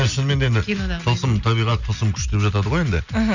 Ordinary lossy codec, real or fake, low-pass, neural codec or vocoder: none; real; 7.2 kHz; none